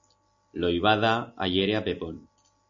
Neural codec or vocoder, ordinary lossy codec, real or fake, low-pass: none; AAC, 64 kbps; real; 7.2 kHz